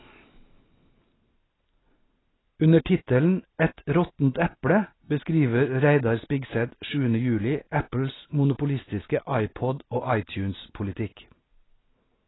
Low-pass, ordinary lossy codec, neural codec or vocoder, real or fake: 7.2 kHz; AAC, 16 kbps; none; real